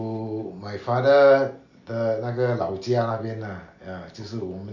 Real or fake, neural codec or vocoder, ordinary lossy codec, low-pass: real; none; none; 7.2 kHz